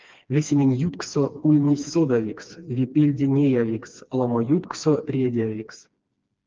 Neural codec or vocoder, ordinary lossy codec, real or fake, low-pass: codec, 16 kHz, 2 kbps, FreqCodec, smaller model; Opus, 24 kbps; fake; 7.2 kHz